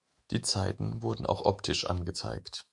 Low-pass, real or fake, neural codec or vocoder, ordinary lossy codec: 10.8 kHz; fake; autoencoder, 48 kHz, 128 numbers a frame, DAC-VAE, trained on Japanese speech; Opus, 64 kbps